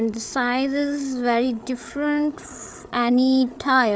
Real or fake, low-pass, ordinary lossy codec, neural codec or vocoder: fake; none; none; codec, 16 kHz, 8 kbps, FreqCodec, larger model